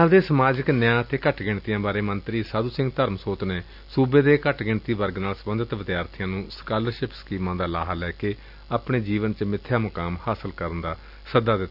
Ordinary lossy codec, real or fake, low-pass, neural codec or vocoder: none; real; 5.4 kHz; none